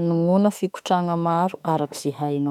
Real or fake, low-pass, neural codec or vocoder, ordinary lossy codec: fake; 19.8 kHz; autoencoder, 48 kHz, 32 numbers a frame, DAC-VAE, trained on Japanese speech; none